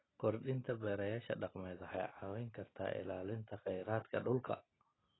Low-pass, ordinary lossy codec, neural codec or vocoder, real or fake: 7.2 kHz; AAC, 16 kbps; none; real